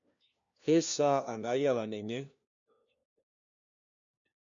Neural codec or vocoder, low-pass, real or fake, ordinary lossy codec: codec, 16 kHz, 1 kbps, FunCodec, trained on LibriTTS, 50 frames a second; 7.2 kHz; fake; MP3, 64 kbps